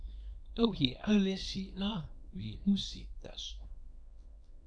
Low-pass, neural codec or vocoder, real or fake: 9.9 kHz; codec, 24 kHz, 0.9 kbps, WavTokenizer, small release; fake